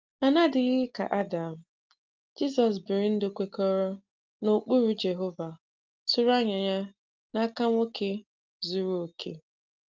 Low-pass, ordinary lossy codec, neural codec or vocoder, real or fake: 7.2 kHz; Opus, 24 kbps; none; real